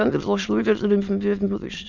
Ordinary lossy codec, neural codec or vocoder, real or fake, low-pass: none; autoencoder, 22.05 kHz, a latent of 192 numbers a frame, VITS, trained on many speakers; fake; 7.2 kHz